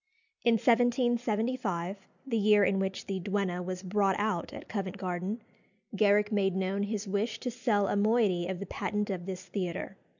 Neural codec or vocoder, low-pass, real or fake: none; 7.2 kHz; real